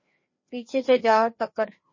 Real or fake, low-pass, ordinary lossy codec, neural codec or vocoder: fake; 7.2 kHz; MP3, 32 kbps; codec, 16 kHz, 2 kbps, FreqCodec, larger model